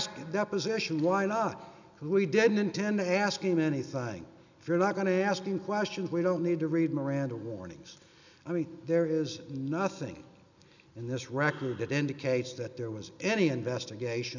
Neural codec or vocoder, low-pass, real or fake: none; 7.2 kHz; real